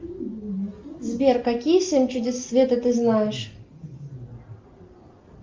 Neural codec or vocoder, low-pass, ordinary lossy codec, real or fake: none; 7.2 kHz; Opus, 32 kbps; real